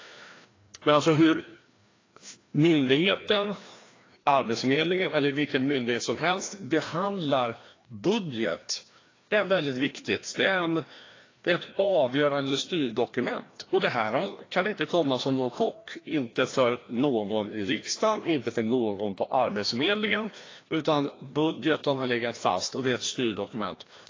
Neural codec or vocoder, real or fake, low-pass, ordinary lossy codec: codec, 16 kHz, 1 kbps, FreqCodec, larger model; fake; 7.2 kHz; AAC, 32 kbps